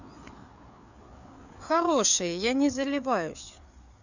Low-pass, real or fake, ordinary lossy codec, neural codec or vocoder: 7.2 kHz; fake; none; codec, 16 kHz, 4 kbps, FreqCodec, larger model